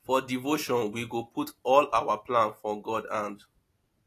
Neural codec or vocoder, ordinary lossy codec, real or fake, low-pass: none; AAC, 48 kbps; real; 14.4 kHz